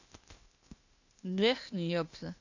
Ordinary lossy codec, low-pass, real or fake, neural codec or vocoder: none; 7.2 kHz; fake; codec, 16 kHz, 0.8 kbps, ZipCodec